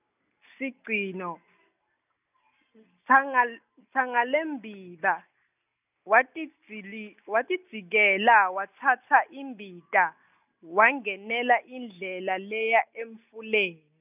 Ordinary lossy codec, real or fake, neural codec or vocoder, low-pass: none; real; none; 3.6 kHz